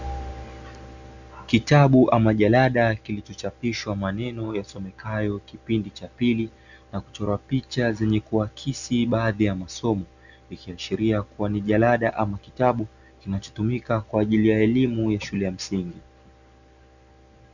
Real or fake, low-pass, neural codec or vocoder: real; 7.2 kHz; none